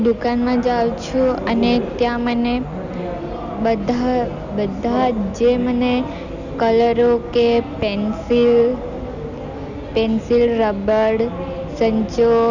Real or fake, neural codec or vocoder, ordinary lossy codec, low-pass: real; none; none; 7.2 kHz